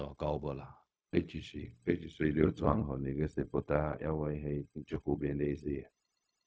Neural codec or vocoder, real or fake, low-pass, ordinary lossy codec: codec, 16 kHz, 0.4 kbps, LongCat-Audio-Codec; fake; none; none